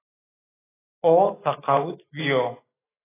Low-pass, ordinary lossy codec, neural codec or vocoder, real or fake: 3.6 kHz; AAC, 16 kbps; autoencoder, 48 kHz, 128 numbers a frame, DAC-VAE, trained on Japanese speech; fake